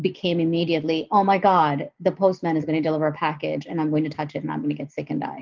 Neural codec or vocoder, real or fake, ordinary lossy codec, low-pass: none; real; Opus, 32 kbps; 7.2 kHz